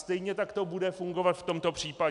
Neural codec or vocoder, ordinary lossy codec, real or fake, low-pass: none; MP3, 96 kbps; real; 10.8 kHz